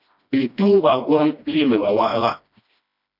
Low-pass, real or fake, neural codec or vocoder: 5.4 kHz; fake; codec, 16 kHz, 1 kbps, FreqCodec, smaller model